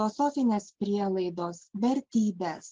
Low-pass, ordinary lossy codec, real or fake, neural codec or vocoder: 7.2 kHz; Opus, 16 kbps; real; none